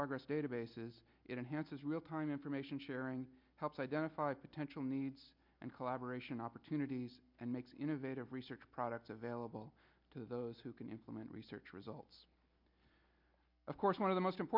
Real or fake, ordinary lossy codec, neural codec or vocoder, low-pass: real; MP3, 48 kbps; none; 5.4 kHz